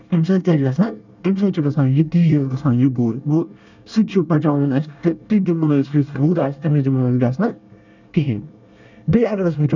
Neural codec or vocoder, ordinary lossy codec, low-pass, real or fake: codec, 24 kHz, 1 kbps, SNAC; none; 7.2 kHz; fake